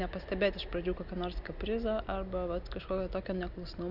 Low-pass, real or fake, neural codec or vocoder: 5.4 kHz; real; none